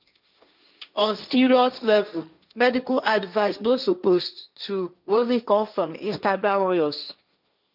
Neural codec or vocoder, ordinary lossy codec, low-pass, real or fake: codec, 16 kHz, 1.1 kbps, Voila-Tokenizer; none; 5.4 kHz; fake